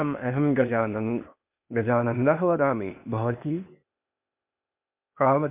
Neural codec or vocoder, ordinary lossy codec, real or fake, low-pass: codec, 16 kHz, 0.8 kbps, ZipCodec; MP3, 32 kbps; fake; 3.6 kHz